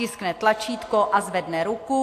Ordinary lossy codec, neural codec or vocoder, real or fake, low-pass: AAC, 64 kbps; none; real; 14.4 kHz